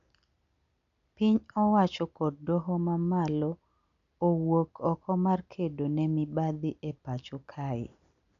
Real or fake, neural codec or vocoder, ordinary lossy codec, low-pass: real; none; none; 7.2 kHz